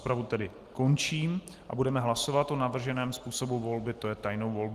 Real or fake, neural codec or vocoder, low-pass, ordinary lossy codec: real; none; 14.4 kHz; Opus, 24 kbps